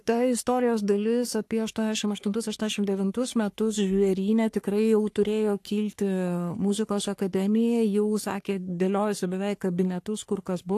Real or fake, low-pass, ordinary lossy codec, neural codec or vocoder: fake; 14.4 kHz; AAC, 64 kbps; codec, 44.1 kHz, 3.4 kbps, Pupu-Codec